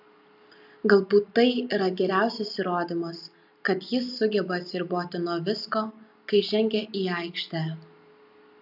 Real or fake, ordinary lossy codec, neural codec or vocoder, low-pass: real; AAC, 48 kbps; none; 5.4 kHz